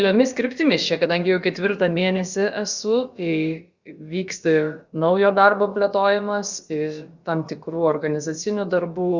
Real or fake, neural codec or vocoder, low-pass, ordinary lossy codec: fake; codec, 16 kHz, about 1 kbps, DyCAST, with the encoder's durations; 7.2 kHz; Opus, 64 kbps